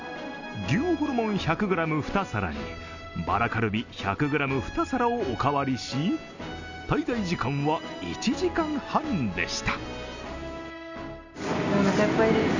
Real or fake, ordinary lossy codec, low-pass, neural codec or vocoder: real; Opus, 64 kbps; 7.2 kHz; none